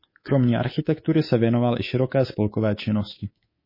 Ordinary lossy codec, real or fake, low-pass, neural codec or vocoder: MP3, 24 kbps; fake; 5.4 kHz; codec, 24 kHz, 3.1 kbps, DualCodec